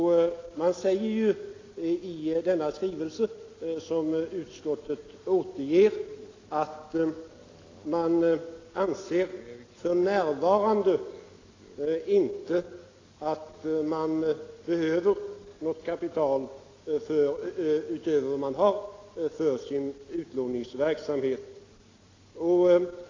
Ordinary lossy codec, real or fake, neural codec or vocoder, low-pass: AAC, 32 kbps; real; none; 7.2 kHz